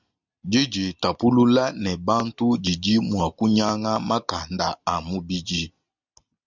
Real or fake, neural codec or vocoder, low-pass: real; none; 7.2 kHz